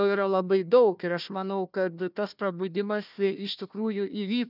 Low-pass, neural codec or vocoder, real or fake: 5.4 kHz; codec, 16 kHz, 1 kbps, FunCodec, trained on Chinese and English, 50 frames a second; fake